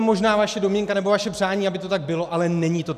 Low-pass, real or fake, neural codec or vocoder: 14.4 kHz; real; none